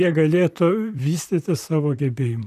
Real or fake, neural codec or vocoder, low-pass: fake; vocoder, 44.1 kHz, 128 mel bands, Pupu-Vocoder; 14.4 kHz